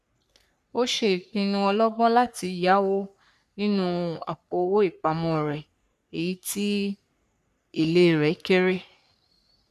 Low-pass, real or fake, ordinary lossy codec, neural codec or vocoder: 14.4 kHz; fake; none; codec, 44.1 kHz, 3.4 kbps, Pupu-Codec